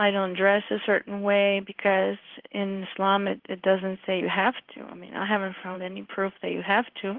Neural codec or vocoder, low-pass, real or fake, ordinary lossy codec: codec, 16 kHz in and 24 kHz out, 1 kbps, XY-Tokenizer; 5.4 kHz; fake; Opus, 16 kbps